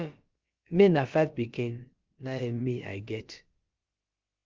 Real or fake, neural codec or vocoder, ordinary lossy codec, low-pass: fake; codec, 16 kHz, about 1 kbps, DyCAST, with the encoder's durations; Opus, 32 kbps; 7.2 kHz